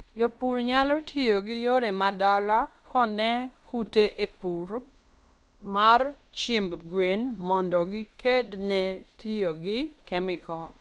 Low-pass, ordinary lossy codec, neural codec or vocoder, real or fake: 10.8 kHz; none; codec, 16 kHz in and 24 kHz out, 0.9 kbps, LongCat-Audio-Codec, fine tuned four codebook decoder; fake